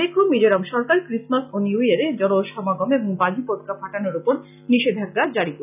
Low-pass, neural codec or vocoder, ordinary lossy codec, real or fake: 3.6 kHz; none; none; real